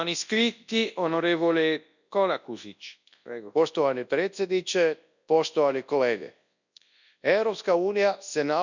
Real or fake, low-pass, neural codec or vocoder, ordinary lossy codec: fake; 7.2 kHz; codec, 24 kHz, 0.9 kbps, WavTokenizer, large speech release; none